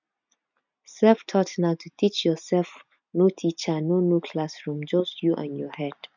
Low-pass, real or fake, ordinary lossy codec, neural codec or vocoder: 7.2 kHz; real; none; none